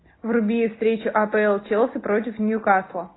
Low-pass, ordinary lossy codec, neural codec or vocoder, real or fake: 7.2 kHz; AAC, 16 kbps; none; real